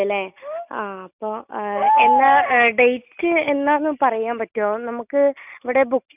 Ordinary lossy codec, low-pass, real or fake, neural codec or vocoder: none; 3.6 kHz; real; none